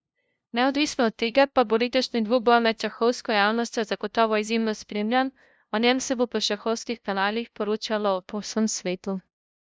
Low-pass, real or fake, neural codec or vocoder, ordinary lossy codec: none; fake; codec, 16 kHz, 0.5 kbps, FunCodec, trained on LibriTTS, 25 frames a second; none